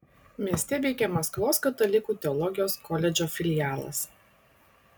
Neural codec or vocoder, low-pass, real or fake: none; 19.8 kHz; real